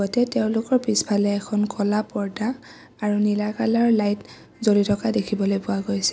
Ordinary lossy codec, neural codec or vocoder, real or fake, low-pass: none; none; real; none